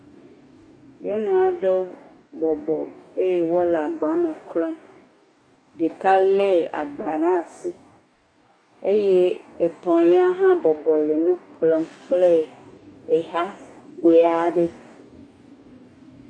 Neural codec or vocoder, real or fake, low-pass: codec, 44.1 kHz, 2.6 kbps, DAC; fake; 9.9 kHz